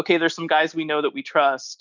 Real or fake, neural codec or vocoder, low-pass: real; none; 7.2 kHz